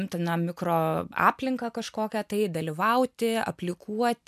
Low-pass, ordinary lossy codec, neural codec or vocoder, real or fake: 14.4 kHz; MP3, 96 kbps; none; real